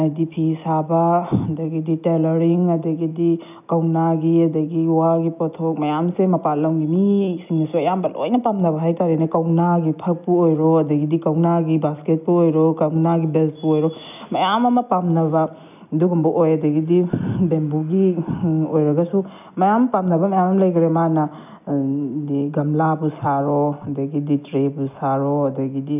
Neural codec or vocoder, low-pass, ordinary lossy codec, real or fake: none; 3.6 kHz; none; real